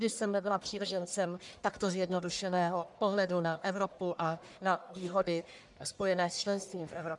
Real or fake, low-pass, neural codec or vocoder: fake; 10.8 kHz; codec, 44.1 kHz, 1.7 kbps, Pupu-Codec